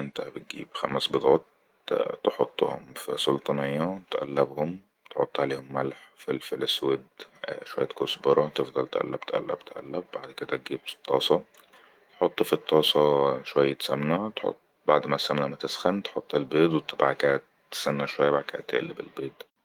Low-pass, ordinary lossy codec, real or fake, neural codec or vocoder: 19.8 kHz; Opus, 24 kbps; fake; autoencoder, 48 kHz, 128 numbers a frame, DAC-VAE, trained on Japanese speech